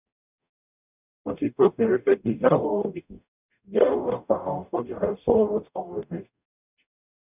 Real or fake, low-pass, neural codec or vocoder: fake; 3.6 kHz; codec, 44.1 kHz, 0.9 kbps, DAC